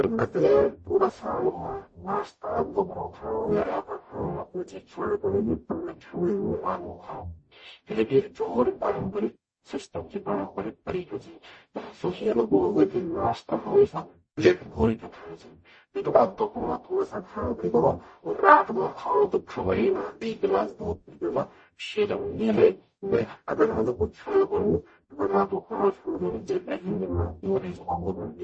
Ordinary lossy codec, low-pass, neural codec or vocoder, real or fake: MP3, 32 kbps; 9.9 kHz; codec, 44.1 kHz, 0.9 kbps, DAC; fake